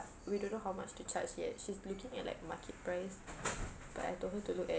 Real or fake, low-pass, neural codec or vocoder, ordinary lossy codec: real; none; none; none